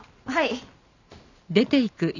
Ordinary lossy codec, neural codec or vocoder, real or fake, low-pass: none; none; real; 7.2 kHz